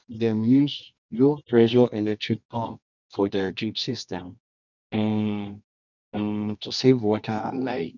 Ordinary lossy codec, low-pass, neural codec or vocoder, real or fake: none; 7.2 kHz; codec, 24 kHz, 0.9 kbps, WavTokenizer, medium music audio release; fake